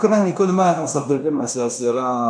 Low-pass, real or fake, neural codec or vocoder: 9.9 kHz; fake; codec, 16 kHz in and 24 kHz out, 0.9 kbps, LongCat-Audio-Codec, fine tuned four codebook decoder